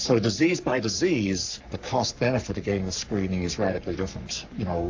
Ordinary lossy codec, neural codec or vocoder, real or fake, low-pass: AAC, 48 kbps; codec, 44.1 kHz, 3.4 kbps, Pupu-Codec; fake; 7.2 kHz